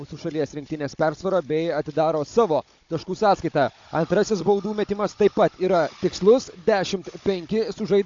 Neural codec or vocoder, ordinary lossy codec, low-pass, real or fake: codec, 16 kHz, 16 kbps, FunCodec, trained on Chinese and English, 50 frames a second; AAC, 48 kbps; 7.2 kHz; fake